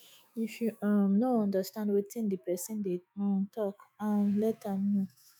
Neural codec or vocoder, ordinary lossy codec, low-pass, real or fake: autoencoder, 48 kHz, 128 numbers a frame, DAC-VAE, trained on Japanese speech; none; none; fake